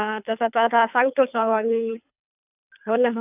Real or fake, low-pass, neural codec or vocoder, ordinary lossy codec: fake; 3.6 kHz; codec, 24 kHz, 3 kbps, HILCodec; none